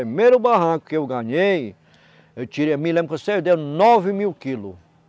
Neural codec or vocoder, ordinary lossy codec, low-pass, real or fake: none; none; none; real